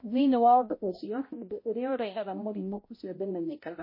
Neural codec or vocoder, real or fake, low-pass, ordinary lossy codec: codec, 16 kHz, 0.5 kbps, X-Codec, HuBERT features, trained on balanced general audio; fake; 5.4 kHz; MP3, 24 kbps